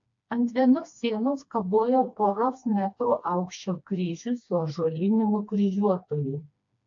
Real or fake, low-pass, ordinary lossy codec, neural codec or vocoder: fake; 7.2 kHz; AAC, 48 kbps; codec, 16 kHz, 2 kbps, FreqCodec, smaller model